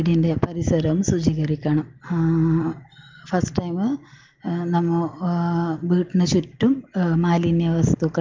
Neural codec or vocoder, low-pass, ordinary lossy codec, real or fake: none; 7.2 kHz; Opus, 32 kbps; real